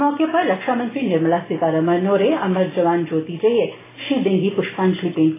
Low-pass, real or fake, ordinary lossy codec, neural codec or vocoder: 3.6 kHz; real; AAC, 16 kbps; none